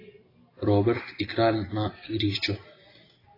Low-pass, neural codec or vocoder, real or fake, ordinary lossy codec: 5.4 kHz; none; real; AAC, 24 kbps